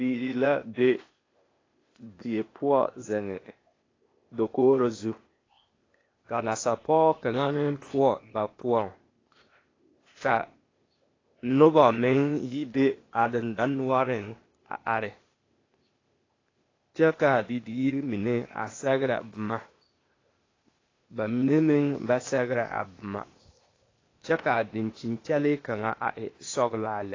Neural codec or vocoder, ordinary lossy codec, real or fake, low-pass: codec, 16 kHz, 0.8 kbps, ZipCodec; AAC, 32 kbps; fake; 7.2 kHz